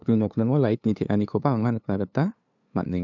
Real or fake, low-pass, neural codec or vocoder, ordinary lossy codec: fake; 7.2 kHz; codec, 16 kHz, 2 kbps, FunCodec, trained on LibriTTS, 25 frames a second; none